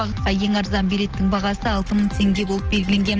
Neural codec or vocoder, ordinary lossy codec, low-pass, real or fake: none; Opus, 16 kbps; 7.2 kHz; real